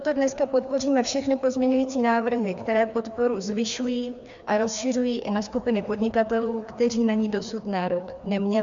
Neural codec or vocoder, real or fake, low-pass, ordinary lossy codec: codec, 16 kHz, 2 kbps, FreqCodec, larger model; fake; 7.2 kHz; MP3, 64 kbps